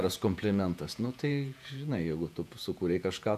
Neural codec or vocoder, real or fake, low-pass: vocoder, 48 kHz, 128 mel bands, Vocos; fake; 14.4 kHz